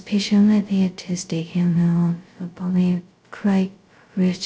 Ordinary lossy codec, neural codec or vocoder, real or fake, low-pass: none; codec, 16 kHz, 0.2 kbps, FocalCodec; fake; none